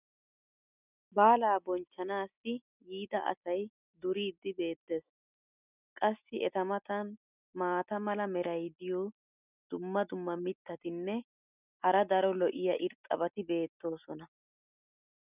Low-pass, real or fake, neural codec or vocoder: 3.6 kHz; real; none